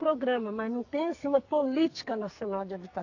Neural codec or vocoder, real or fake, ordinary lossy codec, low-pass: codec, 44.1 kHz, 2.6 kbps, SNAC; fake; AAC, 48 kbps; 7.2 kHz